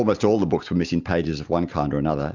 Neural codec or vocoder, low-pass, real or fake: none; 7.2 kHz; real